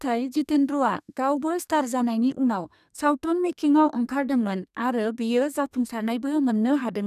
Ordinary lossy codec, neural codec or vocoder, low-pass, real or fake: none; codec, 32 kHz, 1.9 kbps, SNAC; 14.4 kHz; fake